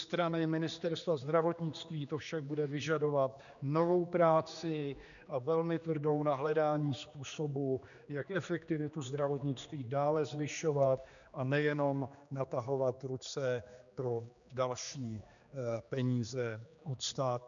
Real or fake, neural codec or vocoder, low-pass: fake; codec, 16 kHz, 2 kbps, X-Codec, HuBERT features, trained on general audio; 7.2 kHz